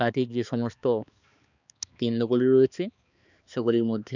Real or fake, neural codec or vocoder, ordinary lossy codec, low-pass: fake; codec, 16 kHz, 2 kbps, X-Codec, HuBERT features, trained on balanced general audio; none; 7.2 kHz